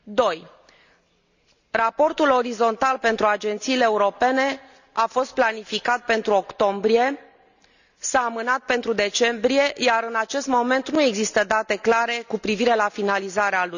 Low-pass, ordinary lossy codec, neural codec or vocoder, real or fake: 7.2 kHz; none; none; real